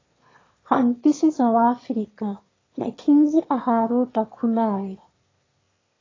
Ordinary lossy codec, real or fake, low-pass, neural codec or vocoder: AAC, 32 kbps; fake; 7.2 kHz; autoencoder, 22.05 kHz, a latent of 192 numbers a frame, VITS, trained on one speaker